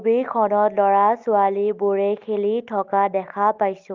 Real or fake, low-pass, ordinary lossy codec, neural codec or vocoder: real; 7.2 kHz; Opus, 32 kbps; none